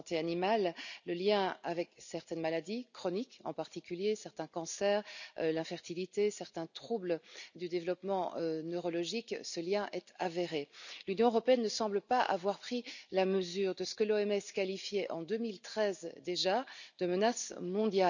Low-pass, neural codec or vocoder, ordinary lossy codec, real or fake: 7.2 kHz; none; none; real